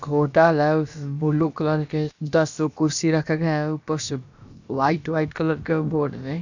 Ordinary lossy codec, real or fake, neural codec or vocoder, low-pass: none; fake; codec, 16 kHz, about 1 kbps, DyCAST, with the encoder's durations; 7.2 kHz